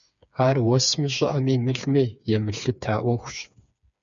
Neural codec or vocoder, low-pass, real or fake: codec, 16 kHz, 4 kbps, FreqCodec, smaller model; 7.2 kHz; fake